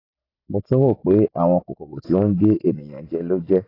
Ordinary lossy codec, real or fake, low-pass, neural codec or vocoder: AAC, 24 kbps; real; 5.4 kHz; none